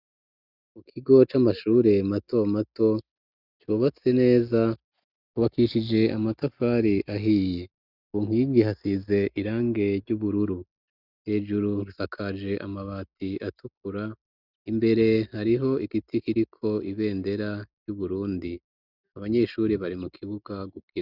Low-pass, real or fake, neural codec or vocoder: 5.4 kHz; real; none